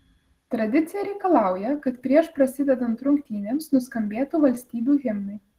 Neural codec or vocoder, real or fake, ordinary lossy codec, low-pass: none; real; Opus, 16 kbps; 14.4 kHz